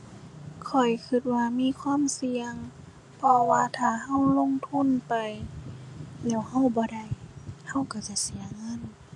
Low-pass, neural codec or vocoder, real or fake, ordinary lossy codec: 10.8 kHz; none; real; none